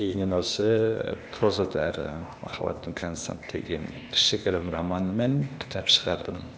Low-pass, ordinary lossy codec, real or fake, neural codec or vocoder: none; none; fake; codec, 16 kHz, 0.8 kbps, ZipCodec